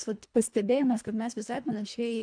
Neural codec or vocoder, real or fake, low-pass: codec, 24 kHz, 1.5 kbps, HILCodec; fake; 9.9 kHz